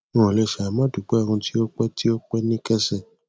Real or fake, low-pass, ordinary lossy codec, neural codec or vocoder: real; none; none; none